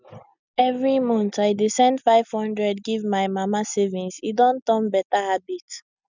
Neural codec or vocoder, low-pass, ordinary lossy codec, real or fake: none; 7.2 kHz; none; real